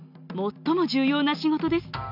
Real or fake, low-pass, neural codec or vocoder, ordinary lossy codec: real; 5.4 kHz; none; none